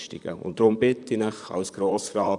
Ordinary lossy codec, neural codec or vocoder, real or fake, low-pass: none; vocoder, 44.1 kHz, 128 mel bands, Pupu-Vocoder; fake; 10.8 kHz